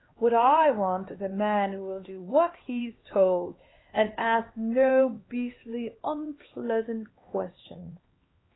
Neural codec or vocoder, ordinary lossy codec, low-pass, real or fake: codec, 16 kHz, 2 kbps, X-Codec, HuBERT features, trained on LibriSpeech; AAC, 16 kbps; 7.2 kHz; fake